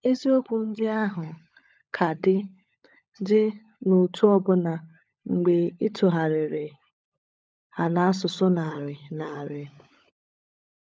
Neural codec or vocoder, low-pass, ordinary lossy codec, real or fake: codec, 16 kHz, 16 kbps, FunCodec, trained on LibriTTS, 50 frames a second; none; none; fake